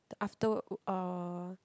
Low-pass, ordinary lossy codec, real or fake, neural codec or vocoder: none; none; real; none